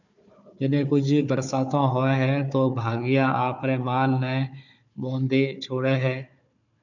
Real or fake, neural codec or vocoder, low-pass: fake; codec, 16 kHz, 4 kbps, FunCodec, trained on Chinese and English, 50 frames a second; 7.2 kHz